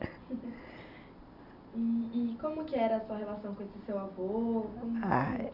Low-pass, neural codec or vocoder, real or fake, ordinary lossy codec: 5.4 kHz; none; real; none